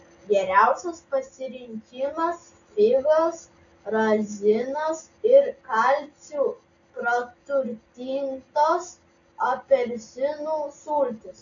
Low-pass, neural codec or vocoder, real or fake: 7.2 kHz; none; real